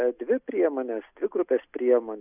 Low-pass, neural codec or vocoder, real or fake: 3.6 kHz; none; real